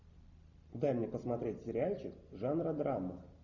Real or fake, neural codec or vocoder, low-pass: real; none; 7.2 kHz